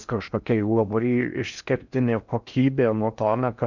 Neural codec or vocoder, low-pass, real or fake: codec, 16 kHz in and 24 kHz out, 0.6 kbps, FocalCodec, streaming, 4096 codes; 7.2 kHz; fake